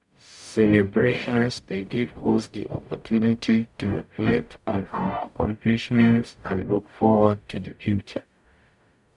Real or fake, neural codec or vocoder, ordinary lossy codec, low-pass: fake; codec, 44.1 kHz, 0.9 kbps, DAC; none; 10.8 kHz